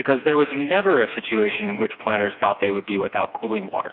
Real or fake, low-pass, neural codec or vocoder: fake; 5.4 kHz; codec, 16 kHz, 2 kbps, FreqCodec, smaller model